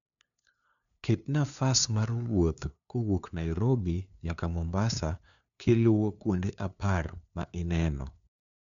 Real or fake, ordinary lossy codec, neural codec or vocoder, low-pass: fake; none; codec, 16 kHz, 2 kbps, FunCodec, trained on LibriTTS, 25 frames a second; 7.2 kHz